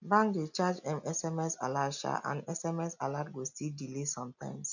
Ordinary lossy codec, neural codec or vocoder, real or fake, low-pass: none; none; real; 7.2 kHz